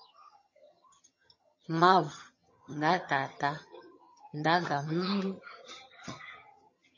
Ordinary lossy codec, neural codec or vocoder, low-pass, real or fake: MP3, 32 kbps; vocoder, 22.05 kHz, 80 mel bands, HiFi-GAN; 7.2 kHz; fake